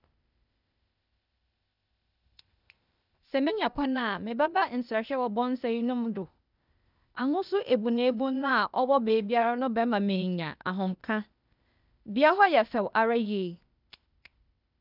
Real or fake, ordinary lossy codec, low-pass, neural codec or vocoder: fake; none; 5.4 kHz; codec, 16 kHz, 0.8 kbps, ZipCodec